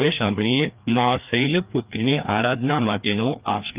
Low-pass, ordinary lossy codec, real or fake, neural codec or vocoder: 3.6 kHz; Opus, 24 kbps; fake; codec, 16 kHz, 2 kbps, FreqCodec, larger model